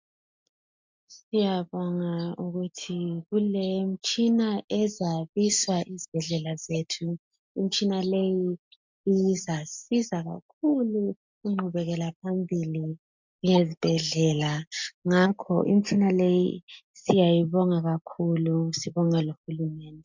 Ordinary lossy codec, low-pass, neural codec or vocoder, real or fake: MP3, 64 kbps; 7.2 kHz; none; real